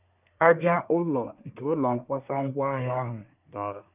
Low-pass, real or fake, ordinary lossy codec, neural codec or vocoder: 3.6 kHz; fake; none; codec, 24 kHz, 1 kbps, SNAC